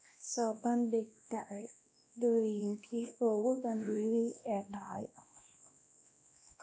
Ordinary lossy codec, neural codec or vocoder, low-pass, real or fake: none; codec, 16 kHz, 1 kbps, X-Codec, WavLM features, trained on Multilingual LibriSpeech; none; fake